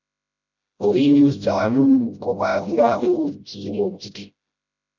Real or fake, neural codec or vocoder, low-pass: fake; codec, 16 kHz, 0.5 kbps, FreqCodec, smaller model; 7.2 kHz